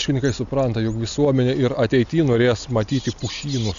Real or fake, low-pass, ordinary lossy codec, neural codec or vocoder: real; 7.2 kHz; AAC, 96 kbps; none